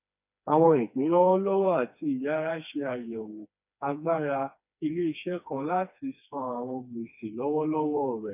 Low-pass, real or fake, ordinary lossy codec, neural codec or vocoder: 3.6 kHz; fake; none; codec, 16 kHz, 2 kbps, FreqCodec, smaller model